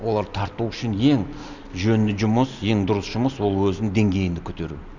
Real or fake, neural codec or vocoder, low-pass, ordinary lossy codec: real; none; 7.2 kHz; none